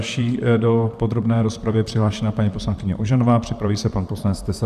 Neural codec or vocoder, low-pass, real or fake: vocoder, 44.1 kHz, 128 mel bands, Pupu-Vocoder; 14.4 kHz; fake